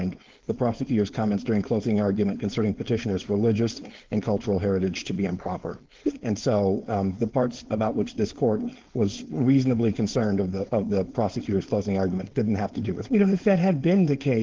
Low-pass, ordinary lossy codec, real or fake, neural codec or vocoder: 7.2 kHz; Opus, 16 kbps; fake; codec, 16 kHz, 4.8 kbps, FACodec